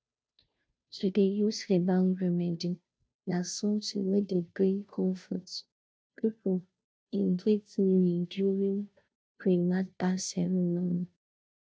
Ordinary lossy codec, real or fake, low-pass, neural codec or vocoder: none; fake; none; codec, 16 kHz, 0.5 kbps, FunCodec, trained on Chinese and English, 25 frames a second